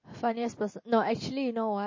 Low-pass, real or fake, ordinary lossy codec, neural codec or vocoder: 7.2 kHz; real; MP3, 32 kbps; none